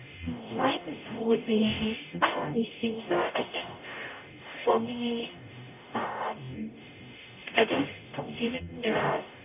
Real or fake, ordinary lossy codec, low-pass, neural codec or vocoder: fake; none; 3.6 kHz; codec, 44.1 kHz, 0.9 kbps, DAC